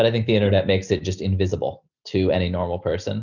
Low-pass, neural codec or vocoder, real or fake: 7.2 kHz; none; real